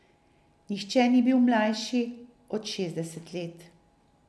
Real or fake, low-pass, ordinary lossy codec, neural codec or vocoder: real; none; none; none